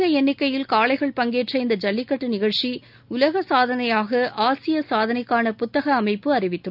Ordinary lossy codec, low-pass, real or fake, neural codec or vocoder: none; 5.4 kHz; real; none